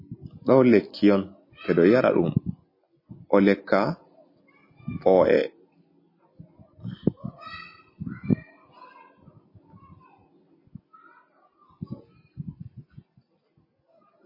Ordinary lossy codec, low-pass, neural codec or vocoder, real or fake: MP3, 24 kbps; 5.4 kHz; none; real